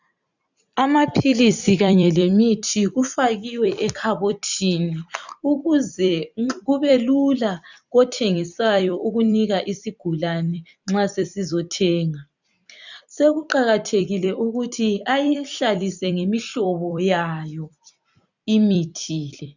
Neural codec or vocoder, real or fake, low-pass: vocoder, 44.1 kHz, 128 mel bands every 256 samples, BigVGAN v2; fake; 7.2 kHz